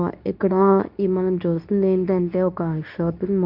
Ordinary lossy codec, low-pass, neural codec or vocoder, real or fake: none; 5.4 kHz; codec, 24 kHz, 0.9 kbps, WavTokenizer, medium speech release version 2; fake